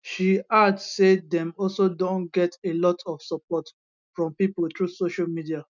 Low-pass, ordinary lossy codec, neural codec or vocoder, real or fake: 7.2 kHz; none; none; real